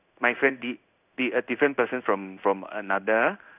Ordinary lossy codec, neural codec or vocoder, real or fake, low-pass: none; codec, 16 kHz in and 24 kHz out, 1 kbps, XY-Tokenizer; fake; 3.6 kHz